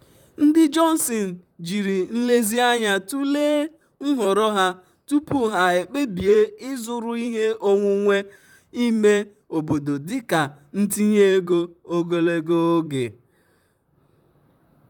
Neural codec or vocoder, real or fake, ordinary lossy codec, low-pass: vocoder, 44.1 kHz, 128 mel bands, Pupu-Vocoder; fake; none; 19.8 kHz